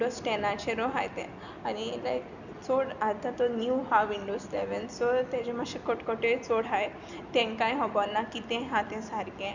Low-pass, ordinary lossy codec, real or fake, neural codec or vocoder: 7.2 kHz; none; real; none